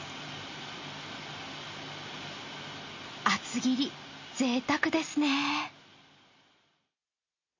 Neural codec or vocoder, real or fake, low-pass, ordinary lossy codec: none; real; 7.2 kHz; MP3, 32 kbps